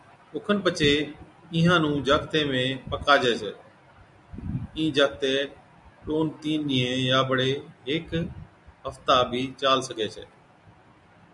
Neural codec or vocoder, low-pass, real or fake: none; 10.8 kHz; real